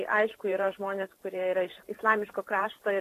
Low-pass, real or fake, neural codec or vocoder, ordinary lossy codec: 14.4 kHz; fake; vocoder, 44.1 kHz, 128 mel bands every 512 samples, BigVGAN v2; AAC, 48 kbps